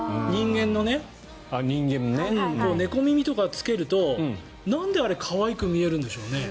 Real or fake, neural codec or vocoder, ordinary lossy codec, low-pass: real; none; none; none